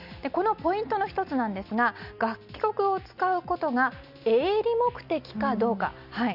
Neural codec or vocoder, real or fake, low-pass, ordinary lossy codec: none; real; 5.4 kHz; none